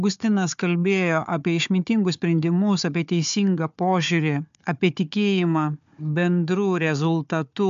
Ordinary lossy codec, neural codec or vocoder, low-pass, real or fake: MP3, 64 kbps; codec, 16 kHz, 4 kbps, FunCodec, trained on Chinese and English, 50 frames a second; 7.2 kHz; fake